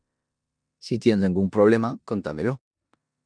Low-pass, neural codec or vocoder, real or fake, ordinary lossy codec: 9.9 kHz; codec, 16 kHz in and 24 kHz out, 0.9 kbps, LongCat-Audio-Codec, four codebook decoder; fake; MP3, 96 kbps